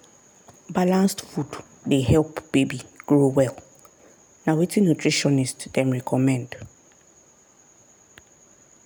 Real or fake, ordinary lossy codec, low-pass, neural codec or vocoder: real; none; none; none